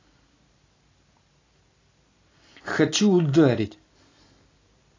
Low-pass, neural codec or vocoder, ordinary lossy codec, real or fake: 7.2 kHz; none; AAC, 32 kbps; real